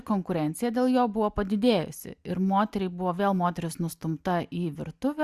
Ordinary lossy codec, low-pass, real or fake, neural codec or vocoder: Opus, 64 kbps; 14.4 kHz; real; none